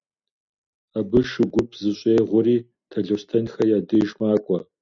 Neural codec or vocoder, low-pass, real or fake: none; 7.2 kHz; real